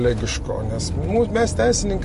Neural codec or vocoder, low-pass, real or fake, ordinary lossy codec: none; 14.4 kHz; real; MP3, 48 kbps